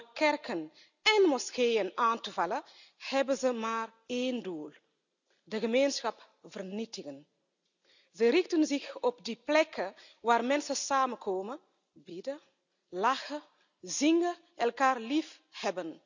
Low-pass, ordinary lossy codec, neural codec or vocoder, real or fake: 7.2 kHz; none; none; real